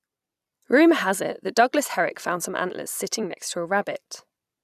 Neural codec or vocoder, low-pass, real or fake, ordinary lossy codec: vocoder, 44.1 kHz, 128 mel bands every 256 samples, BigVGAN v2; 14.4 kHz; fake; none